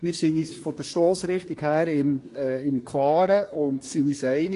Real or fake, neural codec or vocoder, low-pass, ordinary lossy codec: fake; codec, 24 kHz, 1 kbps, SNAC; 10.8 kHz; AAC, 48 kbps